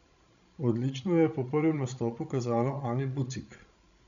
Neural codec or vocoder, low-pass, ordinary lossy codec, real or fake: codec, 16 kHz, 16 kbps, FreqCodec, larger model; 7.2 kHz; none; fake